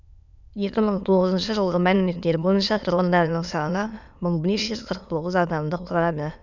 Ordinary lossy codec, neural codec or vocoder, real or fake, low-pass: none; autoencoder, 22.05 kHz, a latent of 192 numbers a frame, VITS, trained on many speakers; fake; 7.2 kHz